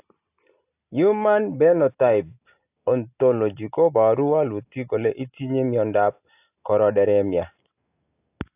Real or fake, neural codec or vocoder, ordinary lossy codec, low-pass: real; none; AAC, 32 kbps; 3.6 kHz